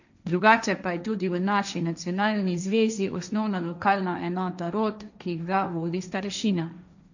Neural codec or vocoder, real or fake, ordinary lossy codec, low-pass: codec, 16 kHz, 1.1 kbps, Voila-Tokenizer; fake; none; 7.2 kHz